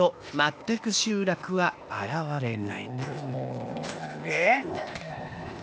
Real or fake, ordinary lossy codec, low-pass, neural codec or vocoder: fake; none; none; codec, 16 kHz, 0.8 kbps, ZipCodec